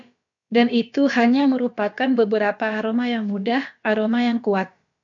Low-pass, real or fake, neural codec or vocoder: 7.2 kHz; fake; codec, 16 kHz, about 1 kbps, DyCAST, with the encoder's durations